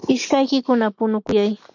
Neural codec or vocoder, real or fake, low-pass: none; real; 7.2 kHz